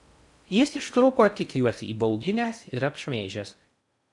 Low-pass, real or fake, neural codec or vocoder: 10.8 kHz; fake; codec, 16 kHz in and 24 kHz out, 0.6 kbps, FocalCodec, streaming, 4096 codes